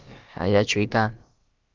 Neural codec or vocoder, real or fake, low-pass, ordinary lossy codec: codec, 16 kHz, about 1 kbps, DyCAST, with the encoder's durations; fake; 7.2 kHz; Opus, 24 kbps